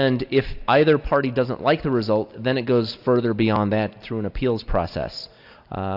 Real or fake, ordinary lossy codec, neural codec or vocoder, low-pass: real; AAC, 48 kbps; none; 5.4 kHz